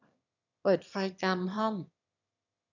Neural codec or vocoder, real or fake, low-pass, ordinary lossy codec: autoencoder, 22.05 kHz, a latent of 192 numbers a frame, VITS, trained on one speaker; fake; 7.2 kHz; none